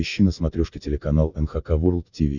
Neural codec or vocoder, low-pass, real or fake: none; 7.2 kHz; real